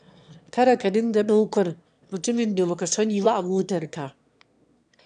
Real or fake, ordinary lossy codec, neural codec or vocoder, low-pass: fake; none; autoencoder, 22.05 kHz, a latent of 192 numbers a frame, VITS, trained on one speaker; 9.9 kHz